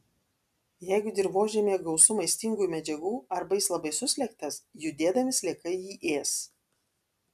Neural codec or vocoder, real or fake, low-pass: none; real; 14.4 kHz